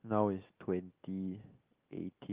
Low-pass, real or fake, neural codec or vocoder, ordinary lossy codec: 3.6 kHz; real; none; Opus, 32 kbps